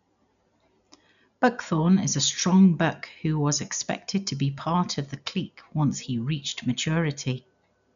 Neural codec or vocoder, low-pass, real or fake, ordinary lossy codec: none; 7.2 kHz; real; none